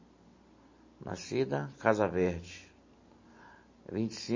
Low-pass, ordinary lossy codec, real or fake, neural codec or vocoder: 7.2 kHz; MP3, 32 kbps; real; none